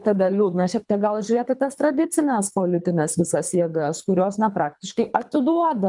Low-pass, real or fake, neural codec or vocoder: 10.8 kHz; fake; codec, 24 kHz, 3 kbps, HILCodec